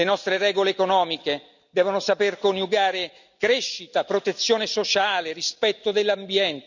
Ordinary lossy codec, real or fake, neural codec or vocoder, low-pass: none; real; none; 7.2 kHz